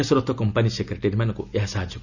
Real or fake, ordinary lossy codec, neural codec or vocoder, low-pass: real; none; none; 7.2 kHz